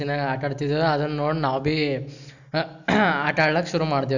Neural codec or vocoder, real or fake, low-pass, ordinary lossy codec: none; real; 7.2 kHz; none